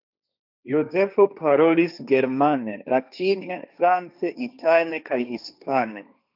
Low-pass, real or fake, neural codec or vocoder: 5.4 kHz; fake; codec, 16 kHz, 1.1 kbps, Voila-Tokenizer